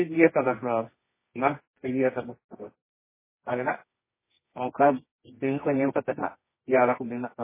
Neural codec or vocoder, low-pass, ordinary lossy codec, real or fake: codec, 24 kHz, 0.9 kbps, WavTokenizer, medium music audio release; 3.6 kHz; MP3, 16 kbps; fake